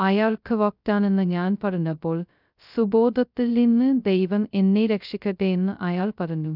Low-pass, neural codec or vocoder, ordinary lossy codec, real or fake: 5.4 kHz; codec, 16 kHz, 0.2 kbps, FocalCodec; none; fake